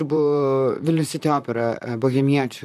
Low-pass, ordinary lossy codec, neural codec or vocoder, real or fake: 14.4 kHz; AAC, 96 kbps; vocoder, 44.1 kHz, 128 mel bands, Pupu-Vocoder; fake